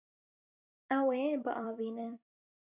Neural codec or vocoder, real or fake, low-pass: vocoder, 44.1 kHz, 128 mel bands every 256 samples, BigVGAN v2; fake; 3.6 kHz